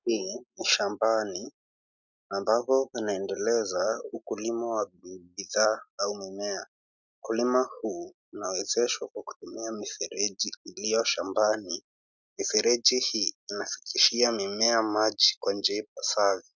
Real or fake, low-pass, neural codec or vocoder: real; 7.2 kHz; none